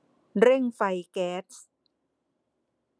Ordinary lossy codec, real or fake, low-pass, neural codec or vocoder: none; real; none; none